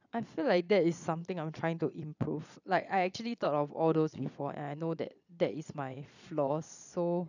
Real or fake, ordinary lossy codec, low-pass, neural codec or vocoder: fake; none; 7.2 kHz; vocoder, 22.05 kHz, 80 mel bands, Vocos